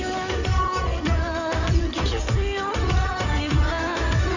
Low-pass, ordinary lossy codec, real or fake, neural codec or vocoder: 7.2 kHz; none; fake; codec, 16 kHz in and 24 kHz out, 2.2 kbps, FireRedTTS-2 codec